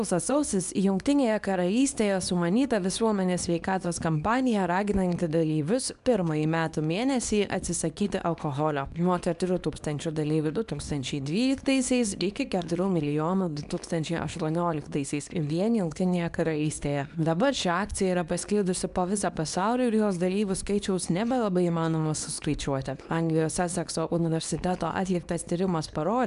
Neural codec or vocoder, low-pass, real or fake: codec, 24 kHz, 0.9 kbps, WavTokenizer, small release; 10.8 kHz; fake